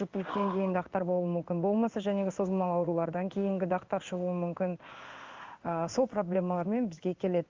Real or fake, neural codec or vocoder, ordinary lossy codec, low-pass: fake; codec, 16 kHz in and 24 kHz out, 1 kbps, XY-Tokenizer; Opus, 32 kbps; 7.2 kHz